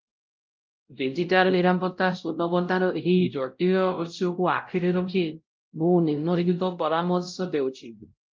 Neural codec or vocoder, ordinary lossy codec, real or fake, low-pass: codec, 16 kHz, 0.5 kbps, X-Codec, WavLM features, trained on Multilingual LibriSpeech; Opus, 32 kbps; fake; 7.2 kHz